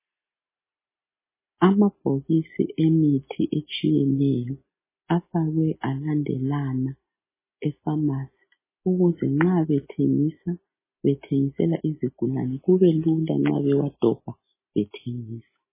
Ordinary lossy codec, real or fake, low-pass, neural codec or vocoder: MP3, 16 kbps; real; 3.6 kHz; none